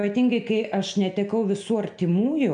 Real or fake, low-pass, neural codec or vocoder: real; 9.9 kHz; none